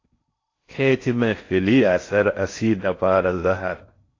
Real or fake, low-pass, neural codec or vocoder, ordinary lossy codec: fake; 7.2 kHz; codec, 16 kHz in and 24 kHz out, 0.6 kbps, FocalCodec, streaming, 4096 codes; AAC, 32 kbps